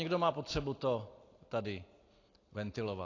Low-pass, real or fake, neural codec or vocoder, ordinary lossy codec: 7.2 kHz; real; none; AAC, 32 kbps